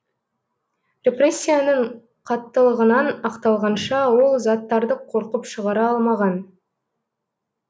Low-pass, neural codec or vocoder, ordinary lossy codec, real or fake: none; none; none; real